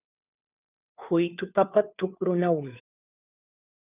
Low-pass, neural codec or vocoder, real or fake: 3.6 kHz; codec, 16 kHz, 2 kbps, FunCodec, trained on Chinese and English, 25 frames a second; fake